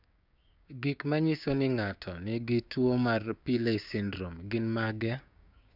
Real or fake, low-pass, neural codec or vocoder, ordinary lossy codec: fake; 5.4 kHz; codec, 16 kHz, 6 kbps, DAC; none